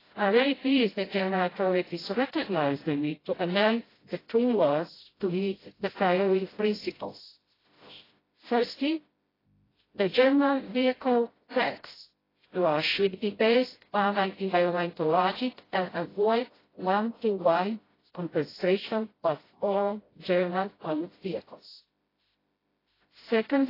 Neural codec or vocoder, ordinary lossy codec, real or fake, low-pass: codec, 16 kHz, 0.5 kbps, FreqCodec, smaller model; AAC, 24 kbps; fake; 5.4 kHz